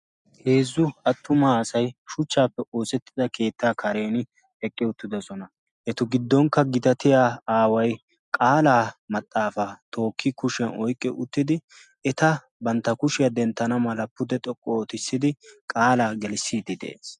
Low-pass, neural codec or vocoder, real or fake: 10.8 kHz; none; real